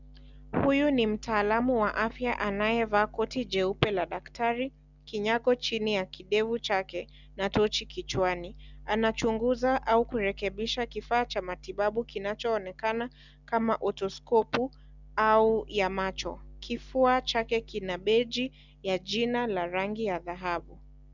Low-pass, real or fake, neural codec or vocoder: 7.2 kHz; real; none